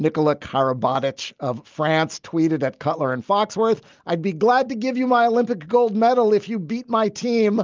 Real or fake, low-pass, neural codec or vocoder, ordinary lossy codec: real; 7.2 kHz; none; Opus, 32 kbps